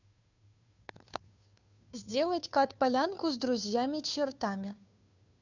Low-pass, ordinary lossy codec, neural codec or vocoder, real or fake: 7.2 kHz; none; codec, 16 kHz, 2 kbps, FunCodec, trained on Chinese and English, 25 frames a second; fake